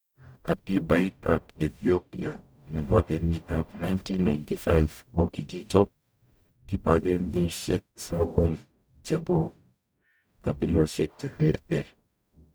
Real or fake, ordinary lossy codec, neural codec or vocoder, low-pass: fake; none; codec, 44.1 kHz, 0.9 kbps, DAC; none